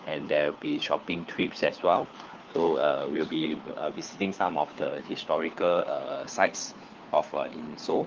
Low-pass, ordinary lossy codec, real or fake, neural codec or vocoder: 7.2 kHz; Opus, 32 kbps; fake; codec, 16 kHz, 4 kbps, FunCodec, trained on LibriTTS, 50 frames a second